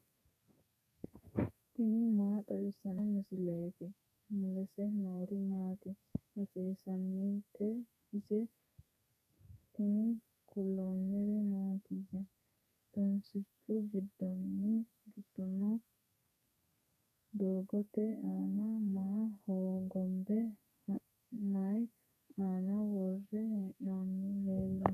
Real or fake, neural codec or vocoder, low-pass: fake; codec, 32 kHz, 1.9 kbps, SNAC; 14.4 kHz